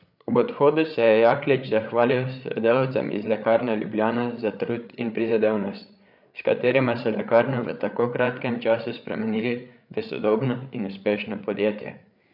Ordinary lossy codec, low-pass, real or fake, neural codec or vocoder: none; 5.4 kHz; fake; codec, 16 kHz, 8 kbps, FreqCodec, larger model